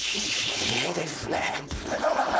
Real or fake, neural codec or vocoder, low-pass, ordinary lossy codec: fake; codec, 16 kHz, 4.8 kbps, FACodec; none; none